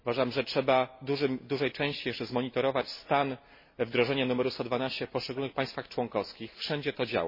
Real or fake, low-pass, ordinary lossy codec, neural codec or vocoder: real; 5.4 kHz; MP3, 24 kbps; none